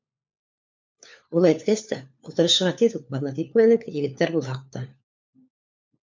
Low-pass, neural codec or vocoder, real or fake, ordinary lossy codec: 7.2 kHz; codec, 16 kHz, 4 kbps, FunCodec, trained on LibriTTS, 50 frames a second; fake; MP3, 64 kbps